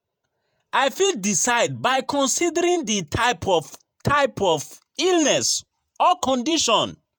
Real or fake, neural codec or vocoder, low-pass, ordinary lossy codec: fake; vocoder, 48 kHz, 128 mel bands, Vocos; none; none